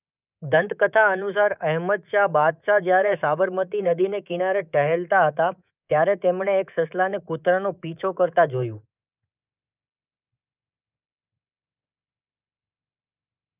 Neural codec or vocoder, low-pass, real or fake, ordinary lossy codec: codec, 24 kHz, 3.1 kbps, DualCodec; 3.6 kHz; fake; none